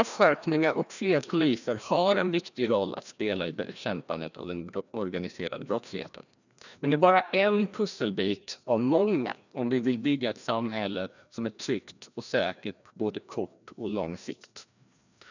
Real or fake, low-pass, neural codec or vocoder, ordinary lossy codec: fake; 7.2 kHz; codec, 16 kHz, 1 kbps, FreqCodec, larger model; none